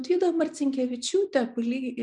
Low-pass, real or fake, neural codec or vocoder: 10.8 kHz; real; none